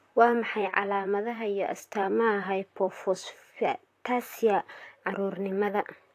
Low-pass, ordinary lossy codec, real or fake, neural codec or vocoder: 14.4 kHz; AAC, 64 kbps; fake; vocoder, 44.1 kHz, 128 mel bands, Pupu-Vocoder